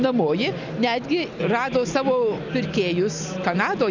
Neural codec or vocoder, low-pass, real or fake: none; 7.2 kHz; real